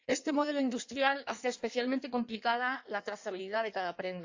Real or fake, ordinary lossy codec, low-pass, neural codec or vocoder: fake; AAC, 48 kbps; 7.2 kHz; codec, 16 kHz in and 24 kHz out, 1.1 kbps, FireRedTTS-2 codec